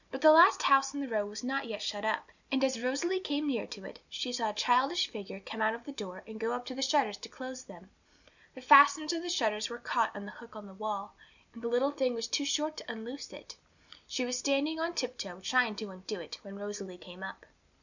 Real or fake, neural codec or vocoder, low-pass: real; none; 7.2 kHz